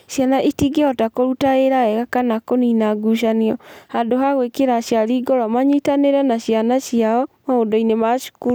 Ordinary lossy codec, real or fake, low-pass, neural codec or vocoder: none; real; none; none